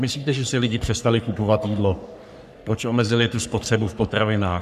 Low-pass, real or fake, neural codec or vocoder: 14.4 kHz; fake; codec, 44.1 kHz, 3.4 kbps, Pupu-Codec